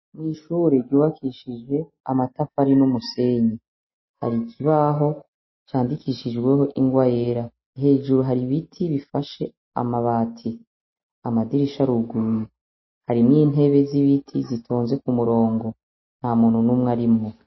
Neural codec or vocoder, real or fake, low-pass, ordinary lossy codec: none; real; 7.2 kHz; MP3, 24 kbps